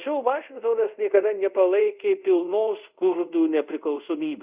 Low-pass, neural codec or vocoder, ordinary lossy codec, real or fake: 3.6 kHz; codec, 24 kHz, 0.5 kbps, DualCodec; Opus, 64 kbps; fake